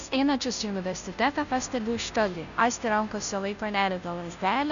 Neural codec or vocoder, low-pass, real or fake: codec, 16 kHz, 0.5 kbps, FunCodec, trained on Chinese and English, 25 frames a second; 7.2 kHz; fake